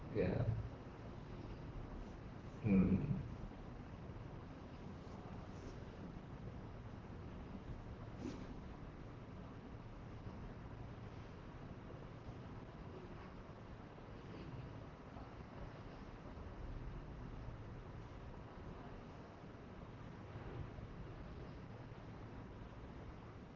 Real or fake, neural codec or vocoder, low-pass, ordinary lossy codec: fake; vocoder, 44.1 kHz, 128 mel bands, Pupu-Vocoder; 7.2 kHz; Opus, 16 kbps